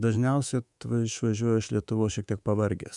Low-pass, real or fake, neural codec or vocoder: 10.8 kHz; fake; codec, 24 kHz, 3.1 kbps, DualCodec